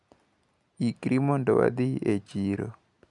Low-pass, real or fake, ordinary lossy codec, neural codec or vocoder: 10.8 kHz; real; none; none